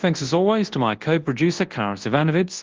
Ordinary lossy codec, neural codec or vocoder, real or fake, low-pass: Opus, 16 kbps; codec, 24 kHz, 0.9 kbps, WavTokenizer, large speech release; fake; 7.2 kHz